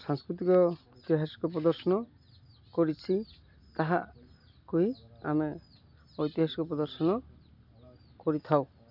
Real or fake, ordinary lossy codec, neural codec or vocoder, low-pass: real; none; none; 5.4 kHz